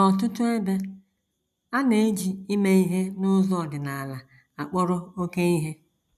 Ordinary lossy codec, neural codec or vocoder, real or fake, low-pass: none; none; real; 14.4 kHz